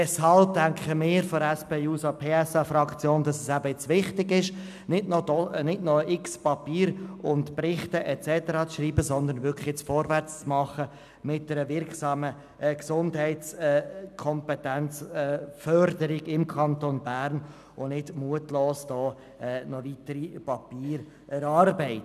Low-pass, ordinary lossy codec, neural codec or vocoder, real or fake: 14.4 kHz; none; none; real